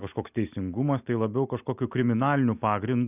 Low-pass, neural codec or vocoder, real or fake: 3.6 kHz; none; real